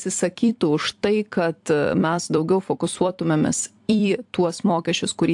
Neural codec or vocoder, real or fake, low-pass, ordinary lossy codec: vocoder, 44.1 kHz, 128 mel bands every 256 samples, BigVGAN v2; fake; 10.8 kHz; AAC, 64 kbps